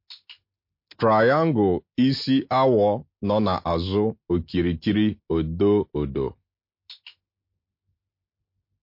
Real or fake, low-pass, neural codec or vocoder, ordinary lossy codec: real; 5.4 kHz; none; MP3, 32 kbps